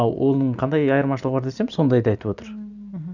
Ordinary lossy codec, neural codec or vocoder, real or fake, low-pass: none; none; real; 7.2 kHz